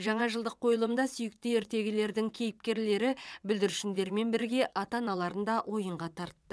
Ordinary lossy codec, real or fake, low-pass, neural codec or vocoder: none; fake; none; vocoder, 22.05 kHz, 80 mel bands, WaveNeXt